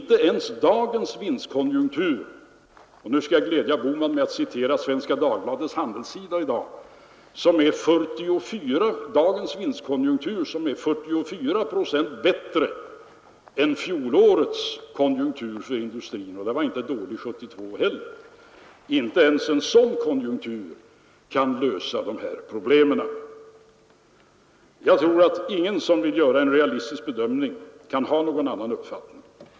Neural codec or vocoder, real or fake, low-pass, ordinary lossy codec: none; real; none; none